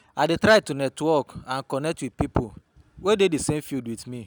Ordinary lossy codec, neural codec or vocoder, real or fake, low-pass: none; none; real; none